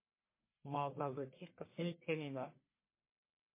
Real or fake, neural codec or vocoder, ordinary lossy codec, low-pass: fake; codec, 44.1 kHz, 1.7 kbps, Pupu-Codec; MP3, 24 kbps; 3.6 kHz